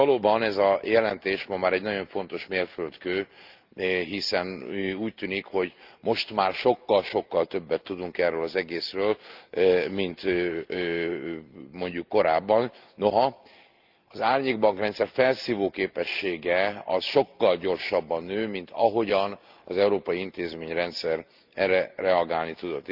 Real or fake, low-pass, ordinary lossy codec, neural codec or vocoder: real; 5.4 kHz; Opus, 24 kbps; none